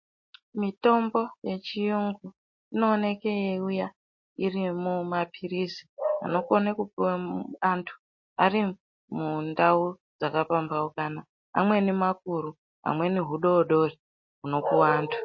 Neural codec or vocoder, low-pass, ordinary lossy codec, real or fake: none; 7.2 kHz; MP3, 32 kbps; real